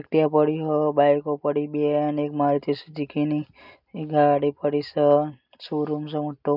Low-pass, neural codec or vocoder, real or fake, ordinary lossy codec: 5.4 kHz; none; real; none